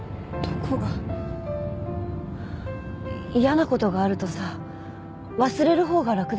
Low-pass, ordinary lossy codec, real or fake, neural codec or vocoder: none; none; real; none